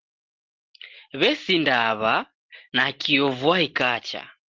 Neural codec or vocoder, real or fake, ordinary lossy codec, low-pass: none; real; Opus, 16 kbps; 7.2 kHz